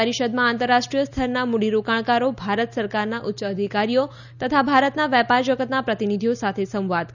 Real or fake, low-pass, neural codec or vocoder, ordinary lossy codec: real; 7.2 kHz; none; none